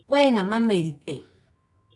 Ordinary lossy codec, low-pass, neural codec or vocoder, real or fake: MP3, 96 kbps; 10.8 kHz; codec, 24 kHz, 0.9 kbps, WavTokenizer, medium music audio release; fake